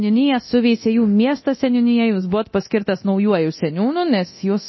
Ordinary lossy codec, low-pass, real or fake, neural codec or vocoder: MP3, 24 kbps; 7.2 kHz; fake; codec, 24 kHz, 0.9 kbps, DualCodec